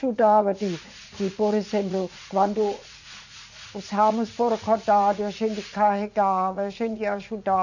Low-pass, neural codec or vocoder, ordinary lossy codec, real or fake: 7.2 kHz; vocoder, 44.1 kHz, 80 mel bands, Vocos; none; fake